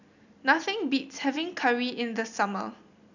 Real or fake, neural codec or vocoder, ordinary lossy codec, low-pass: real; none; none; 7.2 kHz